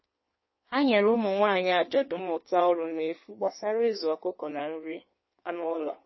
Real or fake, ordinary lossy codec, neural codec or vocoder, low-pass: fake; MP3, 24 kbps; codec, 16 kHz in and 24 kHz out, 1.1 kbps, FireRedTTS-2 codec; 7.2 kHz